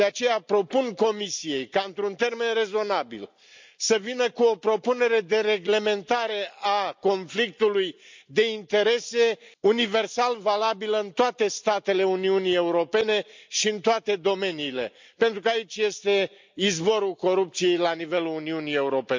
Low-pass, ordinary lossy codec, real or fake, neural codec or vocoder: 7.2 kHz; MP3, 64 kbps; real; none